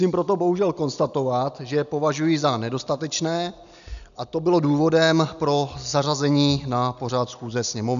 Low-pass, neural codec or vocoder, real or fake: 7.2 kHz; none; real